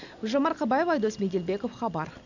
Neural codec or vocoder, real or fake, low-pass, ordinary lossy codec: none; real; 7.2 kHz; none